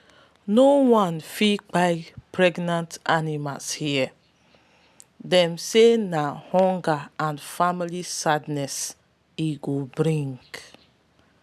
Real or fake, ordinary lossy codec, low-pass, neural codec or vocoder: real; none; 14.4 kHz; none